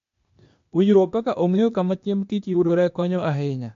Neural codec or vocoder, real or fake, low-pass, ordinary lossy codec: codec, 16 kHz, 0.8 kbps, ZipCodec; fake; 7.2 kHz; MP3, 48 kbps